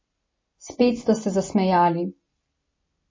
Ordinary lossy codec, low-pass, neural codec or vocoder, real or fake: MP3, 32 kbps; 7.2 kHz; none; real